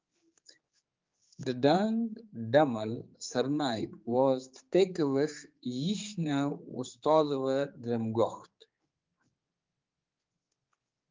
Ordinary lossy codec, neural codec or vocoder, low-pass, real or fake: Opus, 32 kbps; codec, 16 kHz, 4 kbps, X-Codec, HuBERT features, trained on general audio; 7.2 kHz; fake